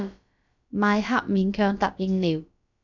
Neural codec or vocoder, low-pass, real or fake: codec, 16 kHz, about 1 kbps, DyCAST, with the encoder's durations; 7.2 kHz; fake